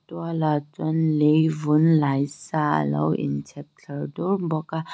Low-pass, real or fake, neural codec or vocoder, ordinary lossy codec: none; real; none; none